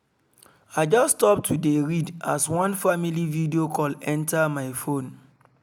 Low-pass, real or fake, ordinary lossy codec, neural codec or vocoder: none; real; none; none